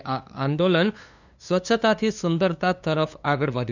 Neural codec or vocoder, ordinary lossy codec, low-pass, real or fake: codec, 16 kHz, 2 kbps, FunCodec, trained on LibriTTS, 25 frames a second; none; 7.2 kHz; fake